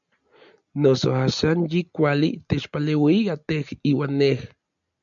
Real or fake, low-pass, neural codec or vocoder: real; 7.2 kHz; none